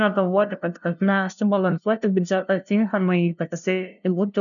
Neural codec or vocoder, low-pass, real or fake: codec, 16 kHz, 0.5 kbps, FunCodec, trained on LibriTTS, 25 frames a second; 7.2 kHz; fake